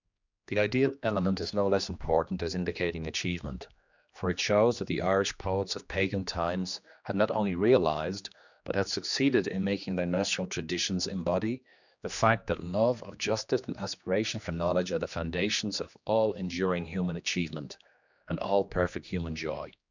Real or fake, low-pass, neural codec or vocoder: fake; 7.2 kHz; codec, 16 kHz, 2 kbps, X-Codec, HuBERT features, trained on general audio